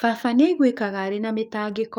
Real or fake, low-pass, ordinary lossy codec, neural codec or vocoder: fake; 19.8 kHz; none; codec, 44.1 kHz, 7.8 kbps, DAC